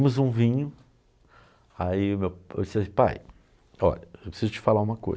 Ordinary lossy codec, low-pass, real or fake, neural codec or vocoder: none; none; real; none